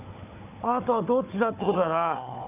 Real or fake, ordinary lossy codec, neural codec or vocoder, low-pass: fake; none; codec, 16 kHz, 4 kbps, FunCodec, trained on Chinese and English, 50 frames a second; 3.6 kHz